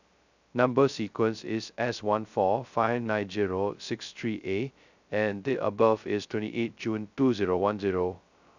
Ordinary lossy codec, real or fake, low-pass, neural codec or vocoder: none; fake; 7.2 kHz; codec, 16 kHz, 0.2 kbps, FocalCodec